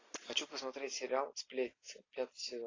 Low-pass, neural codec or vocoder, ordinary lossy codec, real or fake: 7.2 kHz; none; AAC, 32 kbps; real